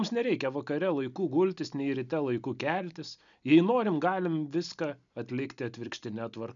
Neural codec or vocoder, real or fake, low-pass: none; real; 7.2 kHz